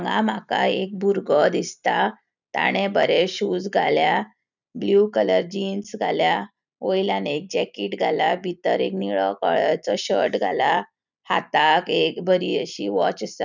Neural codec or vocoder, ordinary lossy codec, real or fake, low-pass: none; none; real; 7.2 kHz